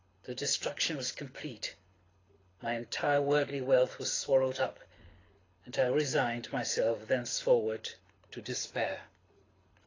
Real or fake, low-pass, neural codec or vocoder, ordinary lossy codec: fake; 7.2 kHz; codec, 24 kHz, 6 kbps, HILCodec; AAC, 32 kbps